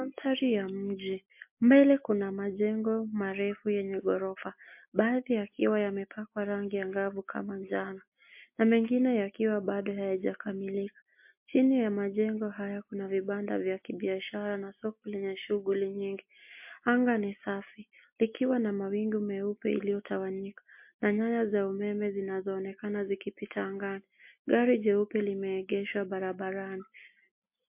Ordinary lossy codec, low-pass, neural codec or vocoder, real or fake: MP3, 32 kbps; 3.6 kHz; none; real